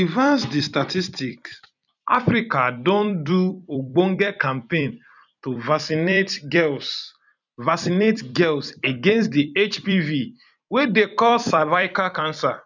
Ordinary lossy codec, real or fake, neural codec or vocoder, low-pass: none; fake; vocoder, 44.1 kHz, 128 mel bands every 256 samples, BigVGAN v2; 7.2 kHz